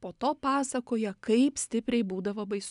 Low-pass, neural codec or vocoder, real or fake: 10.8 kHz; none; real